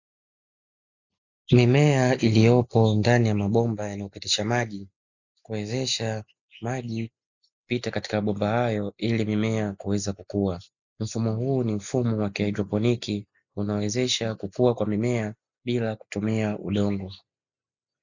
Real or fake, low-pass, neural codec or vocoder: real; 7.2 kHz; none